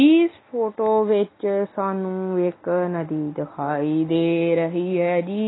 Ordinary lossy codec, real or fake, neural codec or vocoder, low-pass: AAC, 16 kbps; real; none; 7.2 kHz